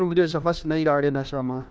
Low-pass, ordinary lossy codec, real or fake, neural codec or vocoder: none; none; fake; codec, 16 kHz, 1 kbps, FunCodec, trained on LibriTTS, 50 frames a second